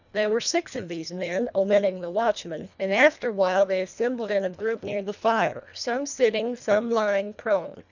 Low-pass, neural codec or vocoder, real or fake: 7.2 kHz; codec, 24 kHz, 1.5 kbps, HILCodec; fake